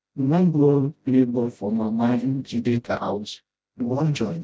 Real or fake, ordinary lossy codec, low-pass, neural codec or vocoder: fake; none; none; codec, 16 kHz, 0.5 kbps, FreqCodec, smaller model